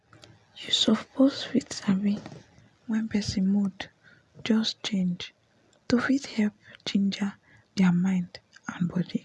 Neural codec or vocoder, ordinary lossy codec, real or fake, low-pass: none; none; real; none